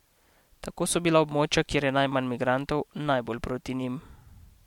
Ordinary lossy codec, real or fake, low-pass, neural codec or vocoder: MP3, 96 kbps; real; 19.8 kHz; none